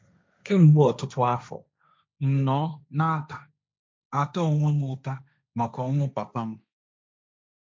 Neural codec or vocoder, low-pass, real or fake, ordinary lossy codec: codec, 16 kHz, 1.1 kbps, Voila-Tokenizer; none; fake; none